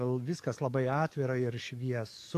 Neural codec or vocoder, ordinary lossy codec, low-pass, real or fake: codec, 44.1 kHz, 7.8 kbps, DAC; AAC, 64 kbps; 14.4 kHz; fake